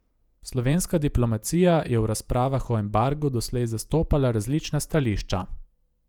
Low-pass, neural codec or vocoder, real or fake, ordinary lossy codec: 19.8 kHz; vocoder, 48 kHz, 128 mel bands, Vocos; fake; none